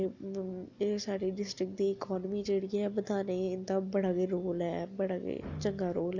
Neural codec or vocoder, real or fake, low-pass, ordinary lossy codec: none; real; 7.2 kHz; none